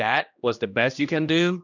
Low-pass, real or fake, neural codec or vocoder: 7.2 kHz; fake; codec, 16 kHz, 1 kbps, X-Codec, HuBERT features, trained on general audio